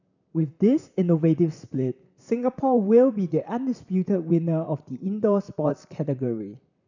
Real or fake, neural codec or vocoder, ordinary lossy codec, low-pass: fake; vocoder, 44.1 kHz, 128 mel bands, Pupu-Vocoder; none; 7.2 kHz